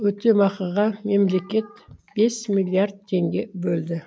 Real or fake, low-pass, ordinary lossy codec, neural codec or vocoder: real; none; none; none